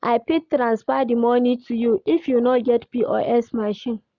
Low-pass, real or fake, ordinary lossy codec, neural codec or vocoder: 7.2 kHz; real; none; none